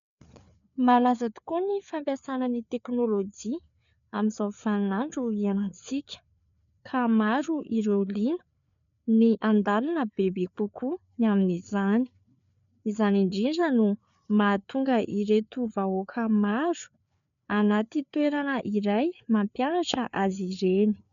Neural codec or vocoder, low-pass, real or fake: codec, 16 kHz, 4 kbps, FreqCodec, larger model; 7.2 kHz; fake